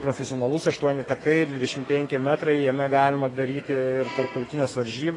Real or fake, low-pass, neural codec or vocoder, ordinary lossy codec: fake; 10.8 kHz; codec, 32 kHz, 1.9 kbps, SNAC; AAC, 32 kbps